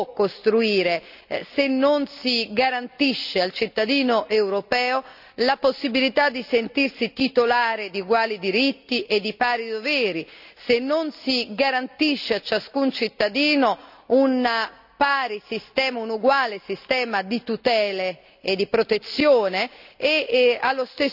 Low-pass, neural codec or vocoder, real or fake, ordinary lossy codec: 5.4 kHz; none; real; none